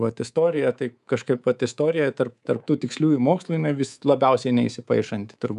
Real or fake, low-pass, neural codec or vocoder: fake; 10.8 kHz; codec, 24 kHz, 3.1 kbps, DualCodec